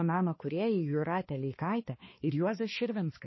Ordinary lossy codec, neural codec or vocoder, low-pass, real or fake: MP3, 24 kbps; codec, 16 kHz, 2 kbps, X-Codec, HuBERT features, trained on balanced general audio; 7.2 kHz; fake